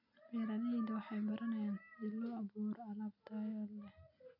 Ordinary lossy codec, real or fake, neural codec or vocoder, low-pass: AAC, 48 kbps; real; none; 5.4 kHz